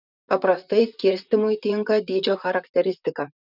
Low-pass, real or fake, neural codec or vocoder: 5.4 kHz; fake; vocoder, 44.1 kHz, 128 mel bands, Pupu-Vocoder